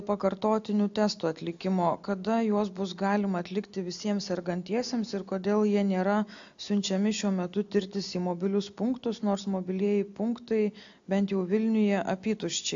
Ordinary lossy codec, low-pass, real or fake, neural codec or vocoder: AAC, 64 kbps; 7.2 kHz; real; none